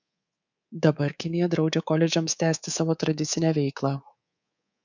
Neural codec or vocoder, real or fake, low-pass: codec, 24 kHz, 3.1 kbps, DualCodec; fake; 7.2 kHz